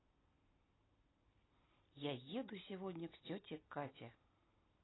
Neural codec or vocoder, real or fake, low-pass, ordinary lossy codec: none; real; 7.2 kHz; AAC, 16 kbps